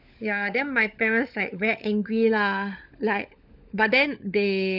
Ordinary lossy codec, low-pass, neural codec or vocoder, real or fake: none; 5.4 kHz; codec, 16 kHz, 16 kbps, FunCodec, trained on LibriTTS, 50 frames a second; fake